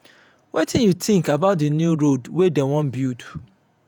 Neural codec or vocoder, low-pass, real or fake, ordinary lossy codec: vocoder, 48 kHz, 128 mel bands, Vocos; 19.8 kHz; fake; none